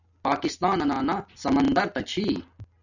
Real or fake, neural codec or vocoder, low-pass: real; none; 7.2 kHz